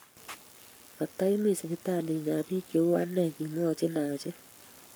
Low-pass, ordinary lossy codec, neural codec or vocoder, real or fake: none; none; codec, 44.1 kHz, 7.8 kbps, Pupu-Codec; fake